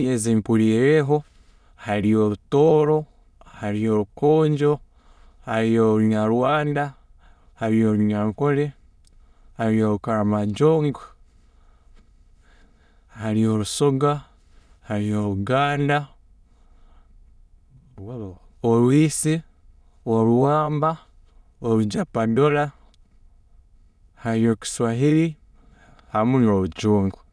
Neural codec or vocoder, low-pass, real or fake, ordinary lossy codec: autoencoder, 22.05 kHz, a latent of 192 numbers a frame, VITS, trained on many speakers; none; fake; none